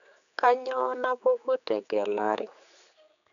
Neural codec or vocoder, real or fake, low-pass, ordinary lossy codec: codec, 16 kHz, 4 kbps, X-Codec, HuBERT features, trained on general audio; fake; 7.2 kHz; none